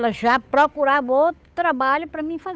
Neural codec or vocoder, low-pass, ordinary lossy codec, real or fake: codec, 16 kHz, 8 kbps, FunCodec, trained on Chinese and English, 25 frames a second; none; none; fake